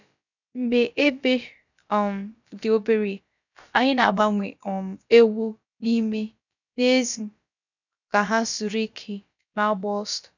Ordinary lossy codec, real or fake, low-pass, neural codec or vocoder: none; fake; 7.2 kHz; codec, 16 kHz, about 1 kbps, DyCAST, with the encoder's durations